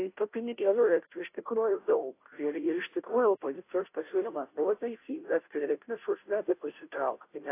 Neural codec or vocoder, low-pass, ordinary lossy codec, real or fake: codec, 16 kHz, 0.5 kbps, FunCodec, trained on Chinese and English, 25 frames a second; 3.6 kHz; AAC, 24 kbps; fake